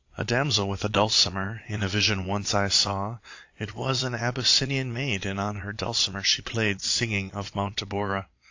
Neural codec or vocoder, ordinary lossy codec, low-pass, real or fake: vocoder, 22.05 kHz, 80 mel bands, Vocos; AAC, 48 kbps; 7.2 kHz; fake